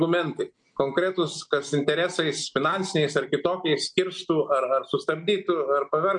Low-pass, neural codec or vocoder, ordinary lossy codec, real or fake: 9.9 kHz; none; MP3, 64 kbps; real